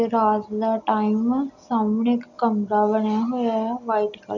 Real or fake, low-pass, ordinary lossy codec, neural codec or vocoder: real; 7.2 kHz; none; none